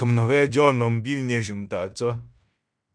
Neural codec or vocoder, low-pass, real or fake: codec, 16 kHz in and 24 kHz out, 0.9 kbps, LongCat-Audio-Codec, fine tuned four codebook decoder; 9.9 kHz; fake